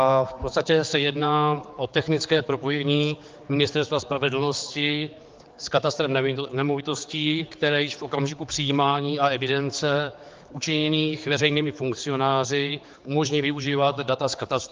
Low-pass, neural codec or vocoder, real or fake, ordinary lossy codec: 7.2 kHz; codec, 16 kHz, 4 kbps, X-Codec, HuBERT features, trained on general audio; fake; Opus, 24 kbps